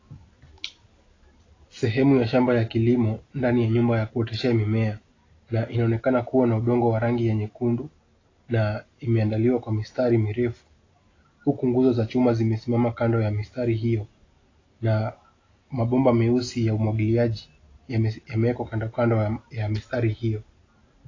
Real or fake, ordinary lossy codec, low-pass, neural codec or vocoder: real; AAC, 32 kbps; 7.2 kHz; none